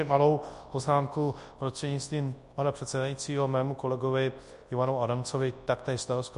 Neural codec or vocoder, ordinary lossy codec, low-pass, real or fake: codec, 24 kHz, 0.9 kbps, WavTokenizer, large speech release; MP3, 48 kbps; 10.8 kHz; fake